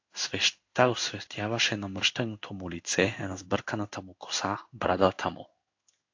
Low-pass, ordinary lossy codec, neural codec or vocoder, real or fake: 7.2 kHz; AAC, 48 kbps; codec, 16 kHz in and 24 kHz out, 1 kbps, XY-Tokenizer; fake